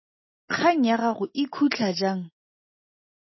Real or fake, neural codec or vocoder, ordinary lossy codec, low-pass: real; none; MP3, 24 kbps; 7.2 kHz